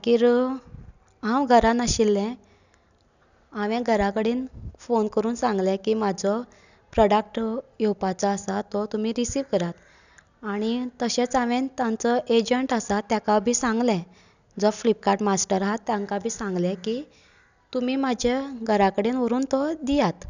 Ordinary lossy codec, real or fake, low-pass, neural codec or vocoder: none; real; 7.2 kHz; none